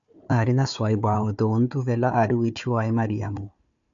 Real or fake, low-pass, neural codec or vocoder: fake; 7.2 kHz; codec, 16 kHz, 4 kbps, FunCodec, trained on Chinese and English, 50 frames a second